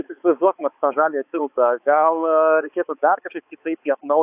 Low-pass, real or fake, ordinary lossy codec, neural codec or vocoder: 3.6 kHz; fake; AAC, 32 kbps; codec, 16 kHz, 4 kbps, X-Codec, HuBERT features, trained on balanced general audio